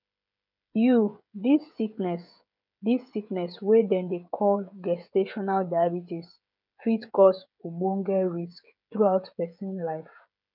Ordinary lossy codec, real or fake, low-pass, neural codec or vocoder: none; fake; 5.4 kHz; codec, 16 kHz, 16 kbps, FreqCodec, smaller model